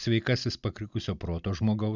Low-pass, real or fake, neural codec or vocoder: 7.2 kHz; real; none